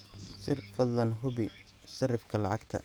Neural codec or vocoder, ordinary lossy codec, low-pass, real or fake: codec, 44.1 kHz, 7.8 kbps, DAC; none; none; fake